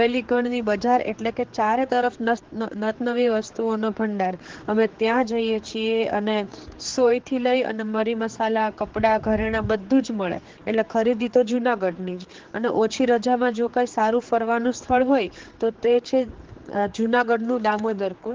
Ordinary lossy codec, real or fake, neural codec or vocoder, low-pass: Opus, 16 kbps; fake; codec, 16 kHz, 4 kbps, X-Codec, HuBERT features, trained on general audio; 7.2 kHz